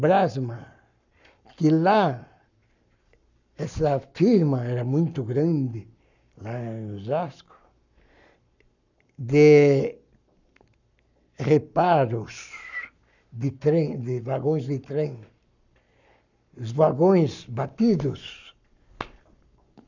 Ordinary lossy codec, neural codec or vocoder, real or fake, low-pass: none; codec, 44.1 kHz, 7.8 kbps, Pupu-Codec; fake; 7.2 kHz